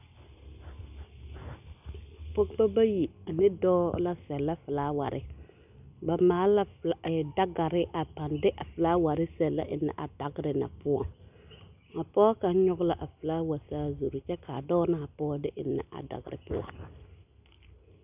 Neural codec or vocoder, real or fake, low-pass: none; real; 3.6 kHz